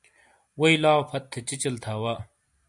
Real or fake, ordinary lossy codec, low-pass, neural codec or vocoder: real; MP3, 96 kbps; 10.8 kHz; none